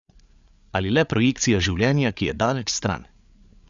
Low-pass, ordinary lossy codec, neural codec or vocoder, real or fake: 7.2 kHz; Opus, 64 kbps; codec, 16 kHz, 8 kbps, FreqCodec, larger model; fake